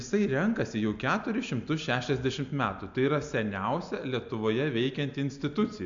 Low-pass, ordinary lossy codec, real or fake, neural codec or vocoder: 7.2 kHz; MP3, 64 kbps; real; none